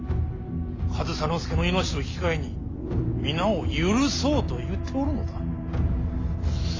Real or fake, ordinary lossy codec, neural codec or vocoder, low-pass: real; AAC, 32 kbps; none; 7.2 kHz